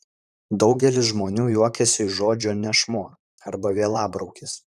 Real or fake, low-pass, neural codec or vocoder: fake; 14.4 kHz; vocoder, 44.1 kHz, 128 mel bands, Pupu-Vocoder